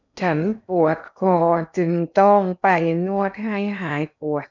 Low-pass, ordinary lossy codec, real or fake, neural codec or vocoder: 7.2 kHz; none; fake; codec, 16 kHz in and 24 kHz out, 0.8 kbps, FocalCodec, streaming, 65536 codes